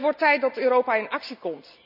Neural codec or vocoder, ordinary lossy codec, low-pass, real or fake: none; none; 5.4 kHz; real